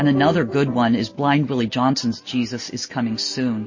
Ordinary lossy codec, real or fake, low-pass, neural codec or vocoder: MP3, 32 kbps; real; 7.2 kHz; none